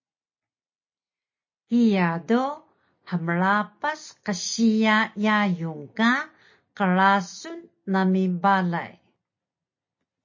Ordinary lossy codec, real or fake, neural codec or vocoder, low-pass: MP3, 32 kbps; real; none; 7.2 kHz